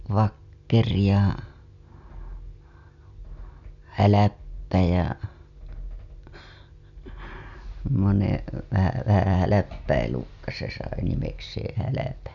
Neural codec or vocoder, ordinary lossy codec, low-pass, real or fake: none; none; 7.2 kHz; real